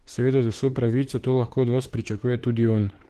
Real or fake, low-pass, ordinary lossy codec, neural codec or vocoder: fake; 14.4 kHz; Opus, 16 kbps; autoencoder, 48 kHz, 32 numbers a frame, DAC-VAE, trained on Japanese speech